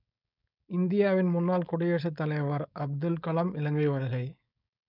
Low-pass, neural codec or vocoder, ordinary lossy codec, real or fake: 5.4 kHz; codec, 16 kHz, 4.8 kbps, FACodec; none; fake